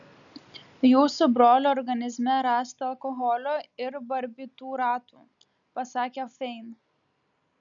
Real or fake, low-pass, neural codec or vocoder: real; 7.2 kHz; none